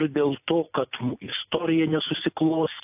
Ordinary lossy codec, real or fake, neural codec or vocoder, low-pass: AAC, 32 kbps; real; none; 3.6 kHz